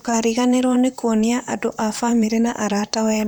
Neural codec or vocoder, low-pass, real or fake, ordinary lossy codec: vocoder, 44.1 kHz, 128 mel bands, Pupu-Vocoder; none; fake; none